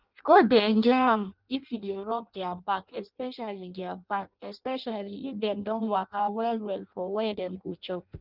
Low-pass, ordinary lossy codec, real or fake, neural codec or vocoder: 5.4 kHz; Opus, 32 kbps; fake; codec, 16 kHz in and 24 kHz out, 0.6 kbps, FireRedTTS-2 codec